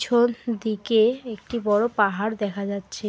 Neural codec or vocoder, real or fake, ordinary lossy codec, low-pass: none; real; none; none